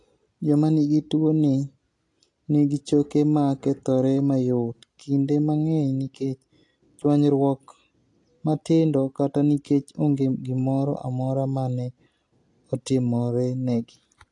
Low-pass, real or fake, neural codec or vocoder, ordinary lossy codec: 10.8 kHz; real; none; AAC, 48 kbps